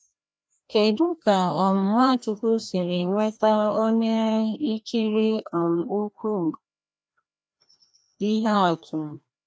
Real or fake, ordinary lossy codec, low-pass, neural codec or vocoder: fake; none; none; codec, 16 kHz, 1 kbps, FreqCodec, larger model